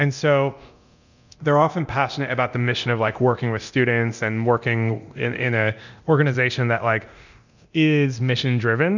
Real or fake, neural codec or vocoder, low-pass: fake; codec, 24 kHz, 0.9 kbps, DualCodec; 7.2 kHz